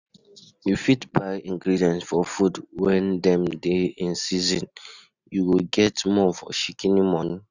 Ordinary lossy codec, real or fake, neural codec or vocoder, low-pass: none; real; none; 7.2 kHz